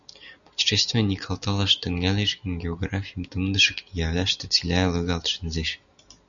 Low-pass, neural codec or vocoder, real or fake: 7.2 kHz; none; real